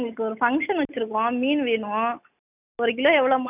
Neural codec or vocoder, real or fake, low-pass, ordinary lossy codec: none; real; 3.6 kHz; none